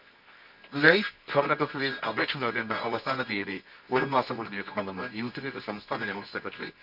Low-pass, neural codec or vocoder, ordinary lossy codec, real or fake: 5.4 kHz; codec, 24 kHz, 0.9 kbps, WavTokenizer, medium music audio release; none; fake